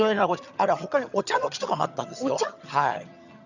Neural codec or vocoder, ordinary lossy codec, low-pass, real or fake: vocoder, 22.05 kHz, 80 mel bands, HiFi-GAN; none; 7.2 kHz; fake